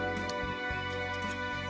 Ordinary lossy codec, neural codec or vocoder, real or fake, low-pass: none; none; real; none